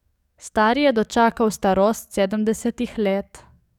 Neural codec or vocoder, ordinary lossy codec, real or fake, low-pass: codec, 44.1 kHz, 7.8 kbps, DAC; none; fake; 19.8 kHz